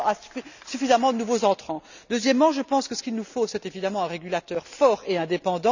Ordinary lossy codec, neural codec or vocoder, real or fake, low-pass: none; none; real; 7.2 kHz